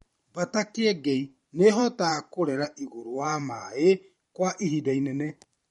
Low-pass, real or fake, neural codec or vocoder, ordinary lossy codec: 19.8 kHz; fake; vocoder, 44.1 kHz, 128 mel bands every 512 samples, BigVGAN v2; MP3, 48 kbps